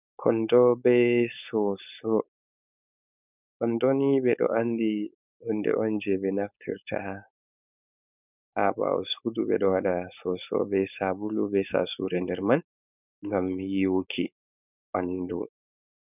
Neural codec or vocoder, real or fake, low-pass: codec, 16 kHz, 4.8 kbps, FACodec; fake; 3.6 kHz